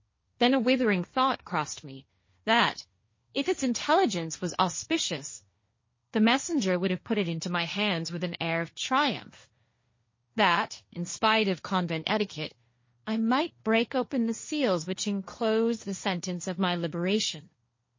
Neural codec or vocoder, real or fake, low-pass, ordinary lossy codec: codec, 16 kHz, 1.1 kbps, Voila-Tokenizer; fake; 7.2 kHz; MP3, 32 kbps